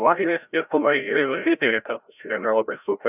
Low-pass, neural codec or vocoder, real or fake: 3.6 kHz; codec, 16 kHz, 0.5 kbps, FreqCodec, larger model; fake